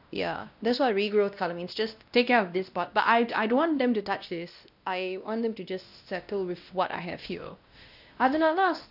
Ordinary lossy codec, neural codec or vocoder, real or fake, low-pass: none; codec, 16 kHz, 1 kbps, X-Codec, WavLM features, trained on Multilingual LibriSpeech; fake; 5.4 kHz